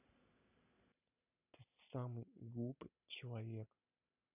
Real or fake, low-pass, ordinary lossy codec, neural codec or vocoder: real; 3.6 kHz; none; none